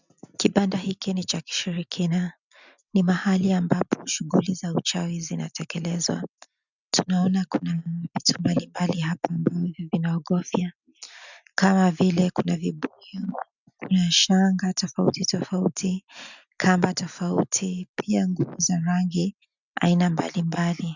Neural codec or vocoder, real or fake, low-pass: none; real; 7.2 kHz